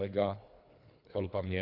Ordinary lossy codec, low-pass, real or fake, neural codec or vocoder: AAC, 48 kbps; 5.4 kHz; fake; codec, 24 kHz, 3 kbps, HILCodec